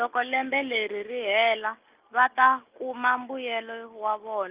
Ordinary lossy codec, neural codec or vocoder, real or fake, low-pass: Opus, 24 kbps; none; real; 3.6 kHz